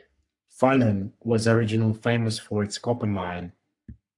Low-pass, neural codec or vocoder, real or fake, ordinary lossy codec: 10.8 kHz; codec, 44.1 kHz, 3.4 kbps, Pupu-Codec; fake; AAC, 64 kbps